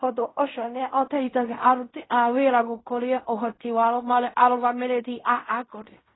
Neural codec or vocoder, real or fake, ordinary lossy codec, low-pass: codec, 16 kHz in and 24 kHz out, 0.4 kbps, LongCat-Audio-Codec, fine tuned four codebook decoder; fake; AAC, 16 kbps; 7.2 kHz